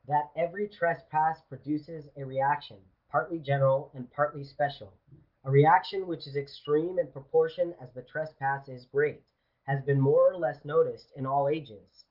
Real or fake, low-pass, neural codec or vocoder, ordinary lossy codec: real; 5.4 kHz; none; Opus, 32 kbps